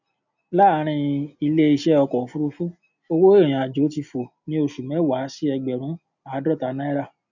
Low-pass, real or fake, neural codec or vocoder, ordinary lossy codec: 7.2 kHz; real; none; none